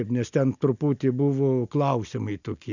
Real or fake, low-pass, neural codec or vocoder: real; 7.2 kHz; none